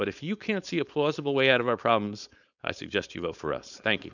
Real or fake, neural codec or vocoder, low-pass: fake; codec, 16 kHz, 4.8 kbps, FACodec; 7.2 kHz